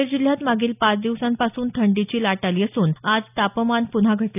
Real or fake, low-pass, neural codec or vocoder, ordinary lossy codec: real; 3.6 kHz; none; none